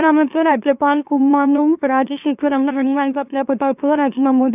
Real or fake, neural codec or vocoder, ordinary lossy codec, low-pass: fake; autoencoder, 44.1 kHz, a latent of 192 numbers a frame, MeloTTS; none; 3.6 kHz